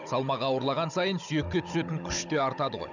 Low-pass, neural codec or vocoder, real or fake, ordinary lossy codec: 7.2 kHz; codec, 16 kHz, 16 kbps, FunCodec, trained on Chinese and English, 50 frames a second; fake; none